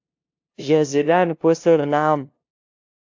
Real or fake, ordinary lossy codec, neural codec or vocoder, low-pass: fake; AAC, 48 kbps; codec, 16 kHz, 0.5 kbps, FunCodec, trained on LibriTTS, 25 frames a second; 7.2 kHz